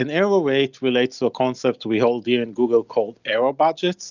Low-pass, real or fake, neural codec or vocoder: 7.2 kHz; real; none